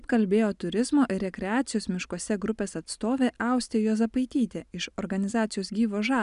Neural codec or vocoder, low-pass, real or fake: none; 10.8 kHz; real